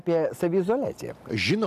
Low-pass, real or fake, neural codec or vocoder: 14.4 kHz; real; none